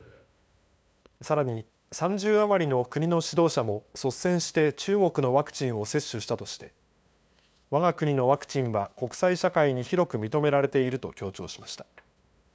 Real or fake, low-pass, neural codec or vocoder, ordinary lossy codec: fake; none; codec, 16 kHz, 2 kbps, FunCodec, trained on LibriTTS, 25 frames a second; none